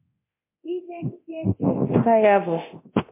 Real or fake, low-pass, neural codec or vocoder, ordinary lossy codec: fake; 3.6 kHz; codec, 24 kHz, 0.9 kbps, DualCodec; MP3, 24 kbps